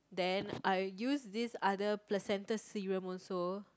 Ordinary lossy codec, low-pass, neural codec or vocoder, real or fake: none; none; none; real